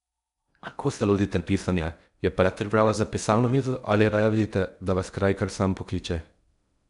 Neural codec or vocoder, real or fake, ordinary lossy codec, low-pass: codec, 16 kHz in and 24 kHz out, 0.6 kbps, FocalCodec, streaming, 4096 codes; fake; none; 10.8 kHz